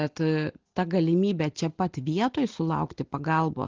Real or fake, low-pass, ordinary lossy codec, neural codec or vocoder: real; 7.2 kHz; Opus, 16 kbps; none